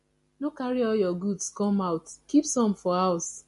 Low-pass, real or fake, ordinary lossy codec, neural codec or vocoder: 14.4 kHz; real; MP3, 48 kbps; none